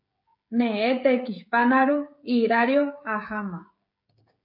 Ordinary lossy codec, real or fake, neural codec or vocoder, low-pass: MP3, 32 kbps; fake; codec, 16 kHz, 16 kbps, FreqCodec, smaller model; 5.4 kHz